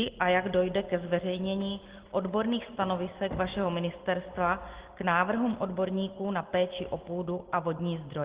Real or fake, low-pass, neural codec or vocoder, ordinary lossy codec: real; 3.6 kHz; none; Opus, 16 kbps